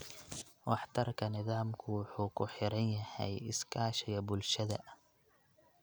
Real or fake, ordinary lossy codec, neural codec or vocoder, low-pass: real; none; none; none